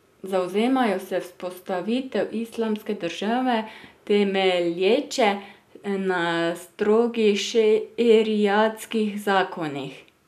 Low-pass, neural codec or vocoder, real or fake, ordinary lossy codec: 14.4 kHz; none; real; none